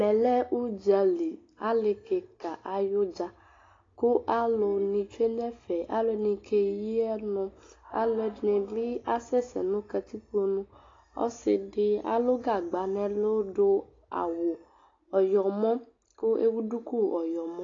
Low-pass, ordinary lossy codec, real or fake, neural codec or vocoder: 7.2 kHz; AAC, 32 kbps; real; none